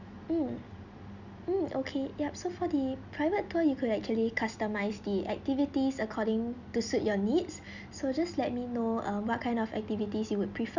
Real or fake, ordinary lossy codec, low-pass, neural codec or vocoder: real; none; 7.2 kHz; none